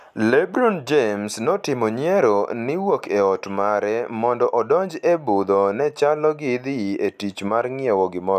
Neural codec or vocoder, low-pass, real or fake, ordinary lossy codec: none; 14.4 kHz; real; none